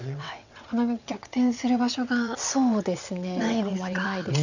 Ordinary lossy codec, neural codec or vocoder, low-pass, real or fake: none; vocoder, 22.05 kHz, 80 mel bands, WaveNeXt; 7.2 kHz; fake